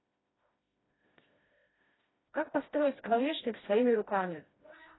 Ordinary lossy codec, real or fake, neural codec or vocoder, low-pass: AAC, 16 kbps; fake; codec, 16 kHz, 1 kbps, FreqCodec, smaller model; 7.2 kHz